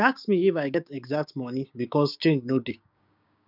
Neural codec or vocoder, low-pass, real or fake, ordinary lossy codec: codec, 16 kHz, 6 kbps, DAC; 5.4 kHz; fake; none